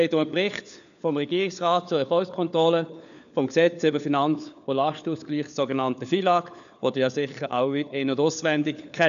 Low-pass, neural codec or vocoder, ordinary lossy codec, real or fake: 7.2 kHz; codec, 16 kHz, 4 kbps, FunCodec, trained on LibriTTS, 50 frames a second; none; fake